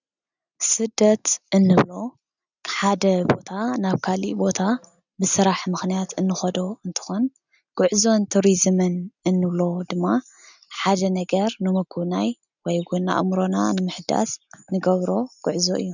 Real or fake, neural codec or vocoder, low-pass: real; none; 7.2 kHz